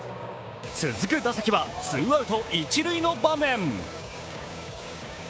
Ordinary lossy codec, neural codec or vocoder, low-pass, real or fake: none; codec, 16 kHz, 6 kbps, DAC; none; fake